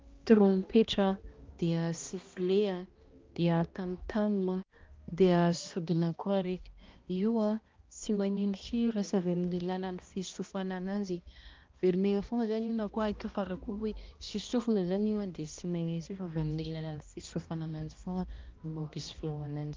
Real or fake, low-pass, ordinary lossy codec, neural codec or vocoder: fake; 7.2 kHz; Opus, 32 kbps; codec, 16 kHz, 1 kbps, X-Codec, HuBERT features, trained on balanced general audio